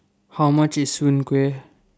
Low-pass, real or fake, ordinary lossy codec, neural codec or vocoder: none; real; none; none